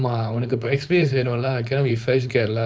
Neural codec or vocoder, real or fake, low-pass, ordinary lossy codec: codec, 16 kHz, 4.8 kbps, FACodec; fake; none; none